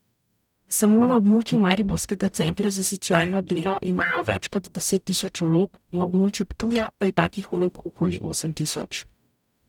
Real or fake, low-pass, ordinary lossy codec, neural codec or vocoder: fake; 19.8 kHz; none; codec, 44.1 kHz, 0.9 kbps, DAC